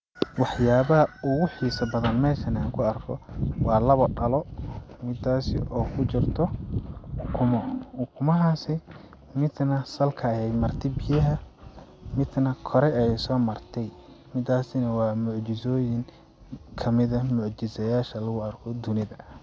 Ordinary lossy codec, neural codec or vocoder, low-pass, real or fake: none; none; none; real